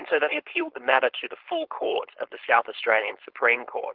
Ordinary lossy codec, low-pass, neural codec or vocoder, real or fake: Opus, 16 kbps; 5.4 kHz; codec, 16 kHz, 4.8 kbps, FACodec; fake